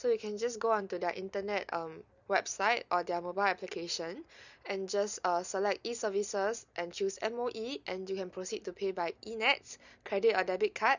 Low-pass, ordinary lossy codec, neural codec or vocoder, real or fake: 7.2 kHz; none; none; real